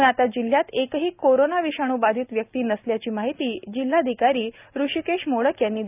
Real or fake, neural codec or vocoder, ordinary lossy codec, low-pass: real; none; none; 3.6 kHz